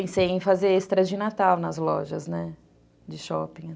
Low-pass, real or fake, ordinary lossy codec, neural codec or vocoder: none; real; none; none